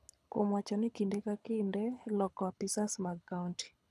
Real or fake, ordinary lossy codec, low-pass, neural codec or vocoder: fake; none; none; codec, 24 kHz, 6 kbps, HILCodec